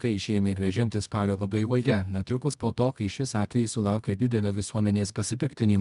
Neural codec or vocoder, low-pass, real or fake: codec, 24 kHz, 0.9 kbps, WavTokenizer, medium music audio release; 10.8 kHz; fake